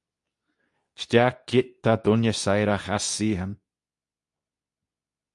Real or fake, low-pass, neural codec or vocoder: fake; 10.8 kHz; codec, 24 kHz, 0.9 kbps, WavTokenizer, medium speech release version 2